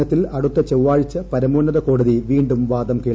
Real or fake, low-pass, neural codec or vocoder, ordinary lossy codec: real; none; none; none